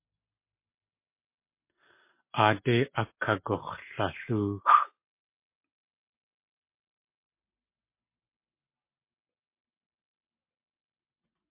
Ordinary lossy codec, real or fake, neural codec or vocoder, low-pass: MP3, 24 kbps; real; none; 3.6 kHz